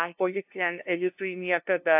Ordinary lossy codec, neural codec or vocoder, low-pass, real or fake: AAC, 32 kbps; codec, 16 kHz, 0.5 kbps, FunCodec, trained on LibriTTS, 25 frames a second; 3.6 kHz; fake